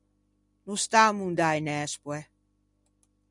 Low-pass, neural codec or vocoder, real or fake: 10.8 kHz; none; real